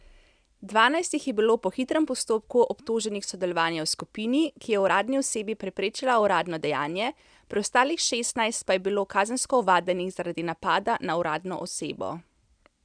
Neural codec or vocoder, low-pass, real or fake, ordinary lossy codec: none; 9.9 kHz; real; none